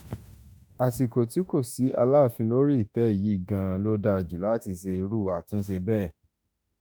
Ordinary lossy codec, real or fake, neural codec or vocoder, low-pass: none; fake; autoencoder, 48 kHz, 32 numbers a frame, DAC-VAE, trained on Japanese speech; none